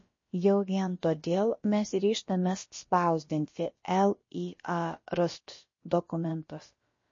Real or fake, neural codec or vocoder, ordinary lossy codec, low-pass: fake; codec, 16 kHz, about 1 kbps, DyCAST, with the encoder's durations; MP3, 32 kbps; 7.2 kHz